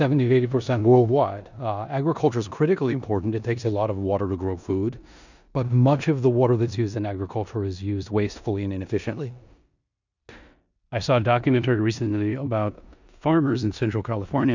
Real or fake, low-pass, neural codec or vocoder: fake; 7.2 kHz; codec, 16 kHz in and 24 kHz out, 0.9 kbps, LongCat-Audio-Codec, four codebook decoder